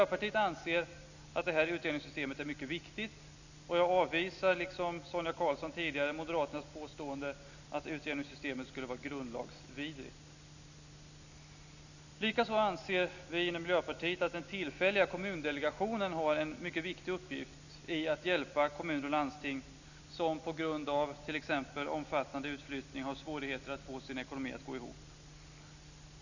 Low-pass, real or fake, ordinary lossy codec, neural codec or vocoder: 7.2 kHz; real; none; none